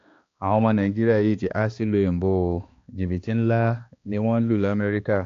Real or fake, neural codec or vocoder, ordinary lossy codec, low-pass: fake; codec, 16 kHz, 2 kbps, X-Codec, HuBERT features, trained on balanced general audio; AAC, 48 kbps; 7.2 kHz